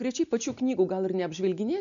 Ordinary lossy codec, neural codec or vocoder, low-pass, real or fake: MP3, 96 kbps; none; 7.2 kHz; real